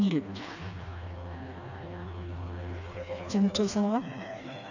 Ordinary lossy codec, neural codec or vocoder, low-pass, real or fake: none; codec, 16 kHz, 2 kbps, FreqCodec, smaller model; 7.2 kHz; fake